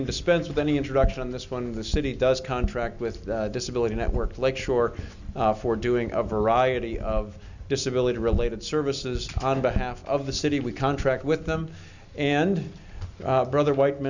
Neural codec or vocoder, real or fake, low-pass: none; real; 7.2 kHz